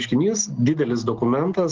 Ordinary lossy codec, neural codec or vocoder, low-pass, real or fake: Opus, 16 kbps; none; 7.2 kHz; real